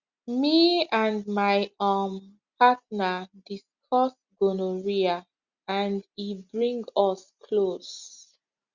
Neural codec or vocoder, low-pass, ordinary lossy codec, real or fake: none; 7.2 kHz; Opus, 64 kbps; real